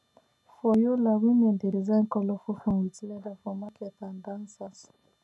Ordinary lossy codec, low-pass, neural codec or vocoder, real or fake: none; none; none; real